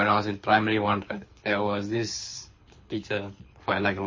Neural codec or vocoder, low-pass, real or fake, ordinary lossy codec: codec, 24 kHz, 6 kbps, HILCodec; 7.2 kHz; fake; MP3, 32 kbps